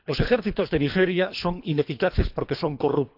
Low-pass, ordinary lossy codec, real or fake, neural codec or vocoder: 5.4 kHz; none; fake; codec, 24 kHz, 3 kbps, HILCodec